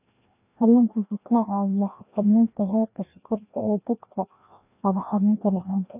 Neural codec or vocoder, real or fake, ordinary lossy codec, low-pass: codec, 16 kHz, 1 kbps, FreqCodec, larger model; fake; none; 3.6 kHz